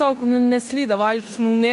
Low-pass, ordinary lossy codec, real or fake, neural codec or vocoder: 10.8 kHz; AAC, 64 kbps; fake; codec, 16 kHz in and 24 kHz out, 0.9 kbps, LongCat-Audio-Codec, fine tuned four codebook decoder